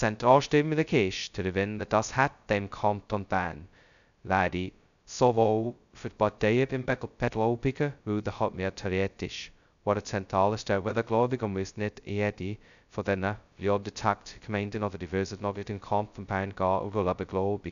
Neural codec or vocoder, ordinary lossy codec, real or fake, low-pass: codec, 16 kHz, 0.2 kbps, FocalCodec; none; fake; 7.2 kHz